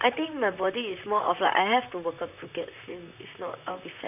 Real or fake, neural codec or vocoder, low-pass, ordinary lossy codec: fake; vocoder, 44.1 kHz, 128 mel bands, Pupu-Vocoder; 3.6 kHz; none